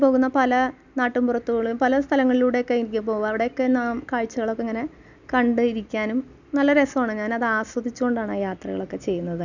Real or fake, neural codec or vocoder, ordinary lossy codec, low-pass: real; none; none; 7.2 kHz